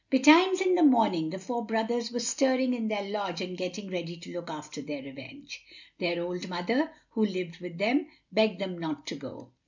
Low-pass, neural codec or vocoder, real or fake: 7.2 kHz; none; real